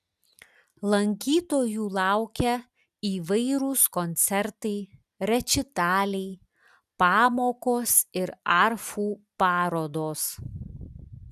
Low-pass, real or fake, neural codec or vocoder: 14.4 kHz; real; none